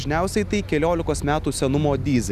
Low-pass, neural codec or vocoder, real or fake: 14.4 kHz; none; real